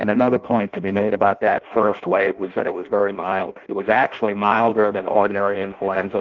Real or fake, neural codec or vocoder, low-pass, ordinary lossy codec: fake; codec, 16 kHz in and 24 kHz out, 0.6 kbps, FireRedTTS-2 codec; 7.2 kHz; Opus, 16 kbps